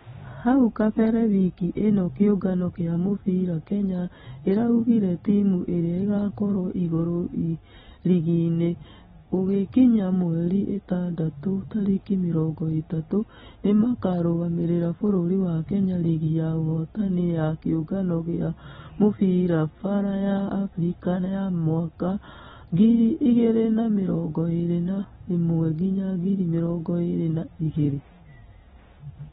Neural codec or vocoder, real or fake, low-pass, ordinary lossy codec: none; real; 19.8 kHz; AAC, 16 kbps